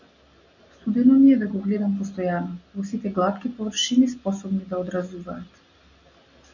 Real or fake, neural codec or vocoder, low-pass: real; none; 7.2 kHz